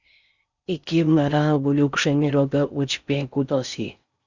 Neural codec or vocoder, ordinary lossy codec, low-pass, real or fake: codec, 16 kHz in and 24 kHz out, 0.6 kbps, FocalCodec, streaming, 4096 codes; Opus, 64 kbps; 7.2 kHz; fake